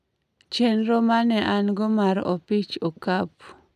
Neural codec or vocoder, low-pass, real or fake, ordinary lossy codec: none; 14.4 kHz; real; none